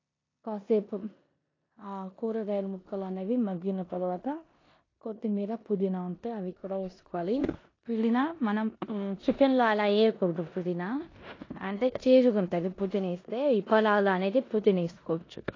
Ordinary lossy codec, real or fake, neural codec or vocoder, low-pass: AAC, 32 kbps; fake; codec, 16 kHz in and 24 kHz out, 0.9 kbps, LongCat-Audio-Codec, four codebook decoder; 7.2 kHz